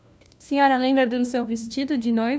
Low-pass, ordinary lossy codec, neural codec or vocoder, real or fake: none; none; codec, 16 kHz, 1 kbps, FunCodec, trained on LibriTTS, 50 frames a second; fake